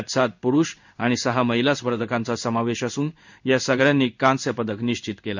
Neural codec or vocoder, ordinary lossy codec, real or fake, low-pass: codec, 16 kHz in and 24 kHz out, 1 kbps, XY-Tokenizer; none; fake; 7.2 kHz